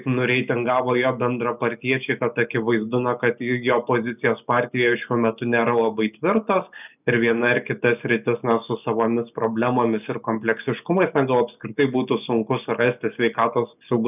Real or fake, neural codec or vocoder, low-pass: real; none; 3.6 kHz